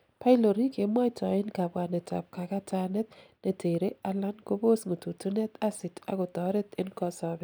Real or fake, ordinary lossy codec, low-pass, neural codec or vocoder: real; none; none; none